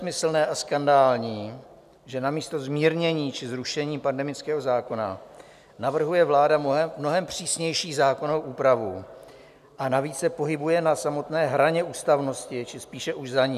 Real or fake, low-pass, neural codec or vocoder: real; 14.4 kHz; none